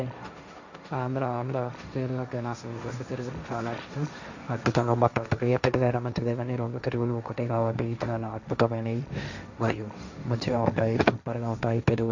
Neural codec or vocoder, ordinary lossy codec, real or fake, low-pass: codec, 16 kHz, 1.1 kbps, Voila-Tokenizer; none; fake; 7.2 kHz